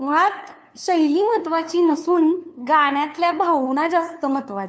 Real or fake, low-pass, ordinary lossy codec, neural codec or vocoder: fake; none; none; codec, 16 kHz, 2 kbps, FunCodec, trained on LibriTTS, 25 frames a second